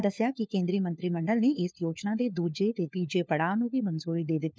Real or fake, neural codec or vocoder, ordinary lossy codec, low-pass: fake; codec, 16 kHz, 4 kbps, FunCodec, trained on LibriTTS, 50 frames a second; none; none